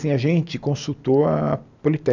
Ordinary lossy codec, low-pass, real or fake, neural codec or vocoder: none; 7.2 kHz; real; none